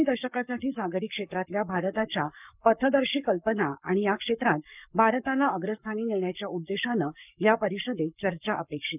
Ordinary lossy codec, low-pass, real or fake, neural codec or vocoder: none; 3.6 kHz; fake; codec, 44.1 kHz, 7.8 kbps, Pupu-Codec